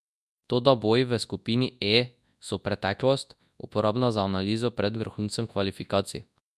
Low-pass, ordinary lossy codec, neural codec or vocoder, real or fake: none; none; codec, 24 kHz, 0.9 kbps, WavTokenizer, large speech release; fake